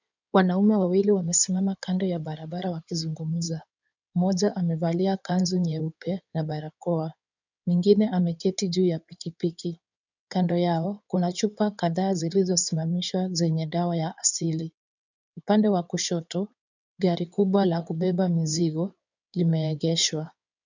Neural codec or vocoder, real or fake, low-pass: codec, 16 kHz in and 24 kHz out, 2.2 kbps, FireRedTTS-2 codec; fake; 7.2 kHz